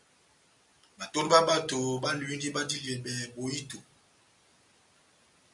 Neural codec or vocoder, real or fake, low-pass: none; real; 10.8 kHz